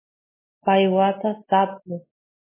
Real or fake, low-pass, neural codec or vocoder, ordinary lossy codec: real; 3.6 kHz; none; MP3, 16 kbps